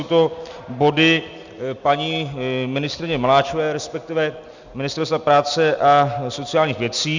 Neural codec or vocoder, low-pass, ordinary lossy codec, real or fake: none; 7.2 kHz; Opus, 64 kbps; real